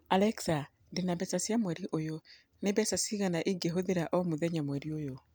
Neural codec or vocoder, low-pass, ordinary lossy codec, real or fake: none; none; none; real